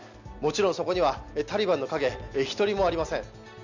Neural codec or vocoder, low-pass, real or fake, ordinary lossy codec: none; 7.2 kHz; real; none